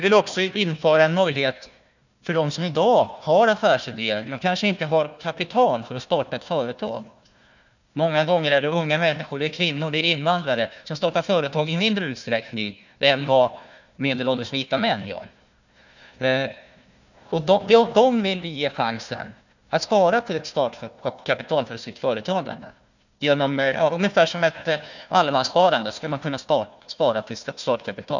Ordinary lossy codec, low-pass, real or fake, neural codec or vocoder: none; 7.2 kHz; fake; codec, 16 kHz, 1 kbps, FunCodec, trained on Chinese and English, 50 frames a second